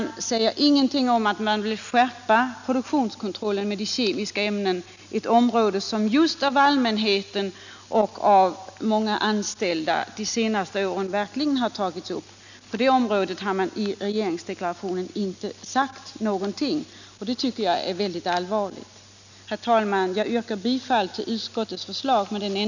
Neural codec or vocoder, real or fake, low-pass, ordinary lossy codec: none; real; 7.2 kHz; none